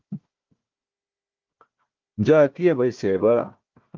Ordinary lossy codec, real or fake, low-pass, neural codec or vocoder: Opus, 24 kbps; fake; 7.2 kHz; codec, 16 kHz, 1 kbps, FunCodec, trained on Chinese and English, 50 frames a second